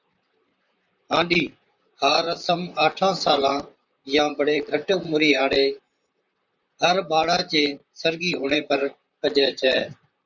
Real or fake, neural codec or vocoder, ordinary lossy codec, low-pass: fake; vocoder, 44.1 kHz, 128 mel bands, Pupu-Vocoder; Opus, 64 kbps; 7.2 kHz